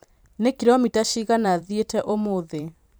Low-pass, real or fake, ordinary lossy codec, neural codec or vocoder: none; fake; none; vocoder, 44.1 kHz, 128 mel bands every 512 samples, BigVGAN v2